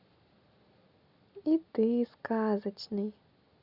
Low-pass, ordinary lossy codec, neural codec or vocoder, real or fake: 5.4 kHz; Opus, 64 kbps; none; real